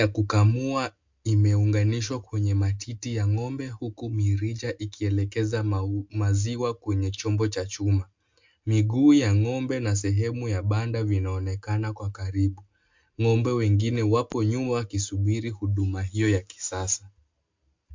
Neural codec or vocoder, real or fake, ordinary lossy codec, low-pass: none; real; MP3, 64 kbps; 7.2 kHz